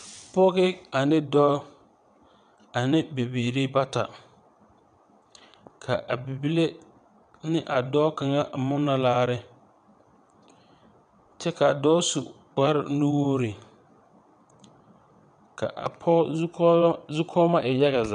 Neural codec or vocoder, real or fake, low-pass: vocoder, 22.05 kHz, 80 mel bands, WaveNeXt; fake; 9.9 kHz